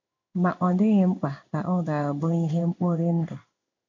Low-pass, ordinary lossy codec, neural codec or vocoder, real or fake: 7.2 kHz; MP3, 48 kbps; codec, 16 kHz in and 24 kHz out, 1 kbps, XY-Tokenizer; fake